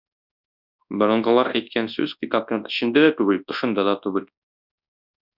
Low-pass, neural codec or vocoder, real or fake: 5.4 kHz; codec, 24 kHz, 0.9 kbps, WavTokenizer, large speech release; fake